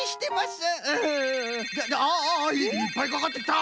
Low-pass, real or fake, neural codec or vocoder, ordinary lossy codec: none; real; none; none